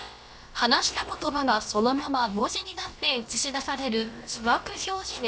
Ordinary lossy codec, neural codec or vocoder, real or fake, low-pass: none; codec, 16 kHz, about 1 kbps, DyCAST, with the encoder's durations; fake; none